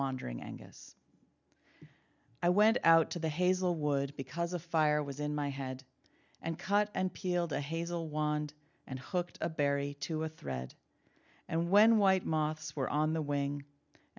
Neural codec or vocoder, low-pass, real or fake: none; 7.2 kHz; real